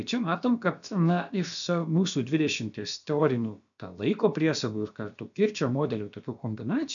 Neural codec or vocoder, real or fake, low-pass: codec, 16 kHz, about 1 kbps, DyCAST, with the encoder's durations; fake; 7.2 kHz